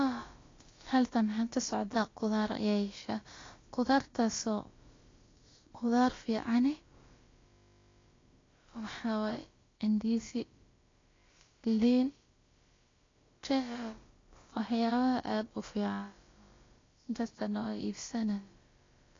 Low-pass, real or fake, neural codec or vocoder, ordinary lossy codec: 7.2 kHz; fake; codec, 16 kHz, about 1 kbps, DyCAST, with the encoder's durations; AAC, 32 kbps